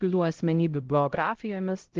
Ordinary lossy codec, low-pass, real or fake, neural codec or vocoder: Opus, 16 kbps; 7.2 kHz; fake; codec, 16 kHz, 0.5 kbps, X-Codec, HuBERT features, trained on LibriSpeech